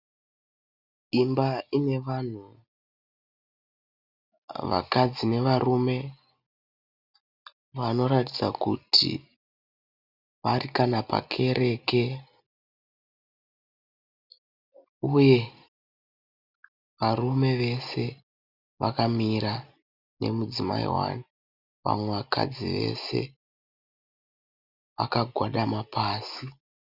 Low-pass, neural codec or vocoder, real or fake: 5.4 kHz; none; real